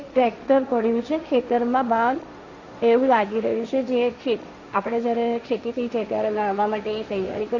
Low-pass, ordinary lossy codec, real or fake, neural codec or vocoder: 7.2 kHz; none; fake; codec, 16 kHz, 1.1 kbps, Voila-Tokenizer